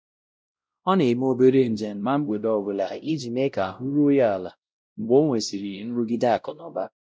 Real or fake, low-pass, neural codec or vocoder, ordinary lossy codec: fake; none; codec, 16 kHz, 0.5 kbps, X-Codec, WavLM features, trained on Multilingual LibriSpeech; none